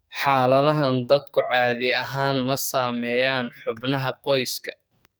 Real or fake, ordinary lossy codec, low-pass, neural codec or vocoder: fake; none; none; codec, 44.1 kHz, 2.6 kbps, SNAC